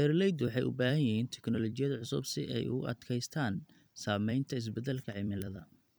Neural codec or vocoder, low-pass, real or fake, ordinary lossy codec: vocoder, 44.1 kHz, 128 mel bands every 256 samples, BigVGAN v2; none; fake; none